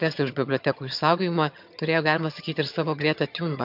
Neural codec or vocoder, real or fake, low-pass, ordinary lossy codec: vocoder, 22.05 kHz, 80 mel bands, HiFi-GAN; fake; 5.4 kHz; MP3, 48 kbps